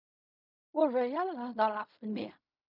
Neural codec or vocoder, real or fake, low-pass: codec, 16 kHz in and 24 kHz out, 0.4 kbps, LongCat-Audio-Codec, fine tuned four codebook decoder; fake; 5.4 kHz